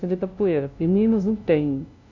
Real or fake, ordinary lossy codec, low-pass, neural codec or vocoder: fake; none; 7.2 kHz; codec, 16 kHz, 0.5 kbps, FunCodec, trained on LibriTTS, 25 frames a second